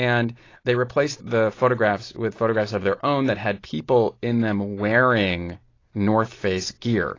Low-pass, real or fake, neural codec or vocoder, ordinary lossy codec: 7.2 kHz; real; none; AAC, 32 kbps